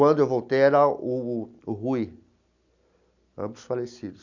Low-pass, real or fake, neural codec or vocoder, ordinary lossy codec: 7.2 kHz; real; none; none